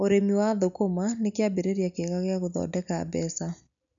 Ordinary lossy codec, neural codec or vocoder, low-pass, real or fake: none; none; 7.2 kHz; real